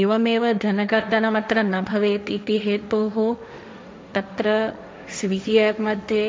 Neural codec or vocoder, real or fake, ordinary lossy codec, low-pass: codec, 16 kHz, 1.1 kbps, Voila-Tokenizer; fake; none; none